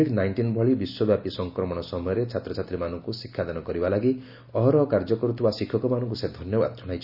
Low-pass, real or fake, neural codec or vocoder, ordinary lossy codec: 5.4 kHz; real; none; Opus, 64 kbps